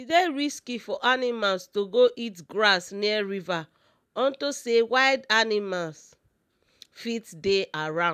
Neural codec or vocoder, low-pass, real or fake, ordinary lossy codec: none; 14.4 kHz; real; none